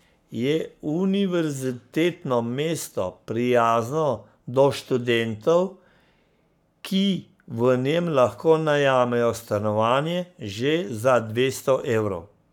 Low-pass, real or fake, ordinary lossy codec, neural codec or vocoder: 19.8 kHz; fake; none; codec, 44.1 kHz, 7.8 kbps, Pupu-Codec